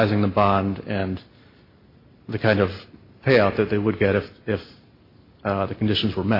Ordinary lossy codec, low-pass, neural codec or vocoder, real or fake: MP3, 32 kbps; 5.4 kHz; none; real